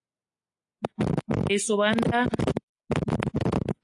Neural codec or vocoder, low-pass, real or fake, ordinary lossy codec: none; 10.8 kHz; real; AAC, 64 kbps